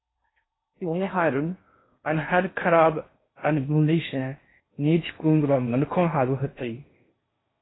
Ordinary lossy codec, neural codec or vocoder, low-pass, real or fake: AAC, 16 kbps; codec, 16 kHz in and 24 kHz out, 0.6 kbps, FocalCodec, streaming, 4096 codes; 7.2 kHz; fake